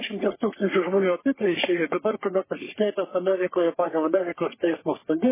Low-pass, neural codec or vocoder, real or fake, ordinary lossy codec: 3.6 kHz; codec, 44.1 kHz, 3.4 kbps, Pupu-Codec; fake; MP3, 16 kbps